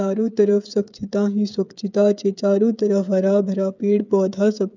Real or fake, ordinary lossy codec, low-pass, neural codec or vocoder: fake; none; 7.2 kHz; codec, 16 kHz, 8 kbps, FreqCodec, smaller model